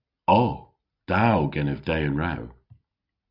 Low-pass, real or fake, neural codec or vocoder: 5.4 kHz; real; none